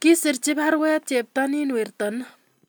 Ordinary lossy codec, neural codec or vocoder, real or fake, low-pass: none; none; real; none